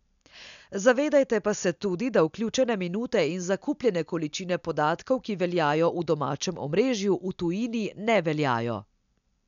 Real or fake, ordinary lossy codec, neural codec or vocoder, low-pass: real; none; none; 7.2 kHz